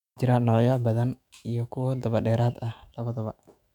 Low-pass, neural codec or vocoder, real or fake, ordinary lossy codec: 19.8 kHz; autoencoder, 48 kHz, 128 numbers a frame, DAC-VAE, trained on Japanese speech; fake; none